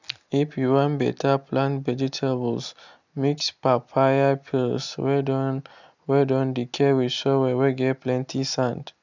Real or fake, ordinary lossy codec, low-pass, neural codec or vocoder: real; none; 7.2 kHz; none